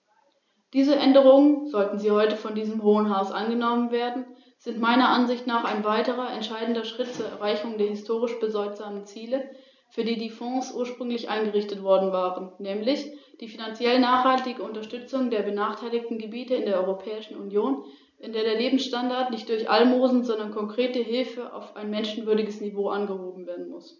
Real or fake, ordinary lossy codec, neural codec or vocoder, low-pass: real; none; none; 7.2 kHz